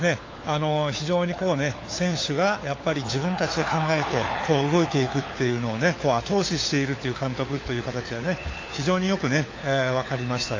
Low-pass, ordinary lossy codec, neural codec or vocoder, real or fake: 7.2 kHz; AAC, 32 kbps; codec, 16 kHz, 4 kbps, FunCodec, trained on LibriTTS, 50 frames a second; fake